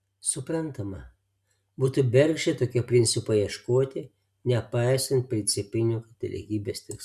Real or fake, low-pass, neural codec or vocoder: real; 14.4 kHz; none